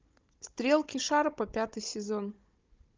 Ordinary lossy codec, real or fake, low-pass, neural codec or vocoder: Opus, 24 kbps; fake; 7.2 kHz; codec, 16 kHz, 16 kbps, FunCodec, trained on Chinese and English, 50 frames a second